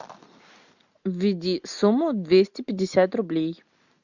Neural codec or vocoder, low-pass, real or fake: none; 7.2 kHz; real